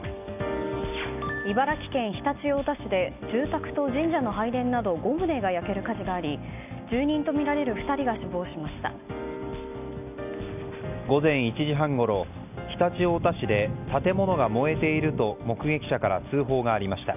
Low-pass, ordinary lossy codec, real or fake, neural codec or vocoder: 3.6 kHz; none; real; none